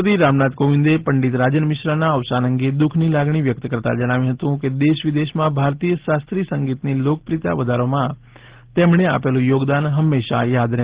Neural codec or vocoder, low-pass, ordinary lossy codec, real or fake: none; 3.6 kHz; Opus, 16 kbps; real